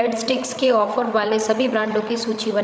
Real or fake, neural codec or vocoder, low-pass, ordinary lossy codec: fake; codec, 16 kHz, 16 kbps, FreqCodec, larger model; none; none